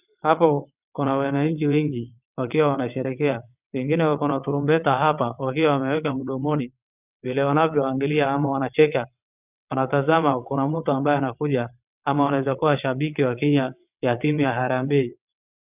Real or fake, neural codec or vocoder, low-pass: fake; vocoder, 22.05 kHz, 80 mel bands, WaveNeXt; 3.6 kHz